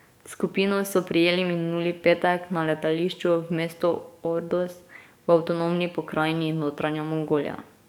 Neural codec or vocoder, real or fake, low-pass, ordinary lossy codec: codec, 44.1 kHz, 7.8 kbps, DAC; fake; 19.8 kHz; none